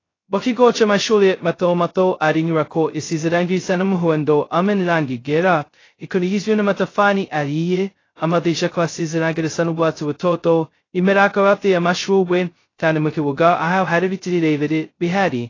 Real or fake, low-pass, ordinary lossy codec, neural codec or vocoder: fake; 7.2 kHz; AAC, 32 kbps; codec, 16 kHz, 0.2 kbps, FocalCodec